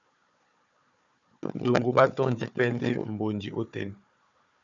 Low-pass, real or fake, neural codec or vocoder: 7.2 kHz; fake; codec, 16 kHz, 4 kbps, FunCodec, trained on Chinese and English, 50 frames a second